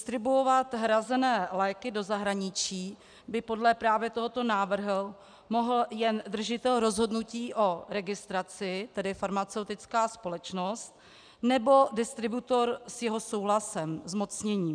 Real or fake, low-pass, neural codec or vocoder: real; 9.9 kHz; none